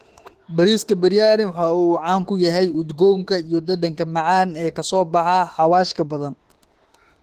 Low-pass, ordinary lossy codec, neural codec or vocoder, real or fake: 14.4 kHz; Opus, 16 kbps; autoencoder, 48 kHz, 32 numbers a frame, DAC-VAE, trained on Japanese speech; fake